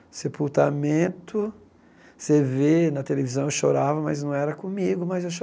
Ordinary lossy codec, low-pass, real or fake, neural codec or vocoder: none; none; real; none